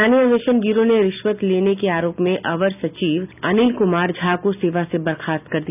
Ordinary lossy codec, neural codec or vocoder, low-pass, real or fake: none; none; 3.6 kHz; real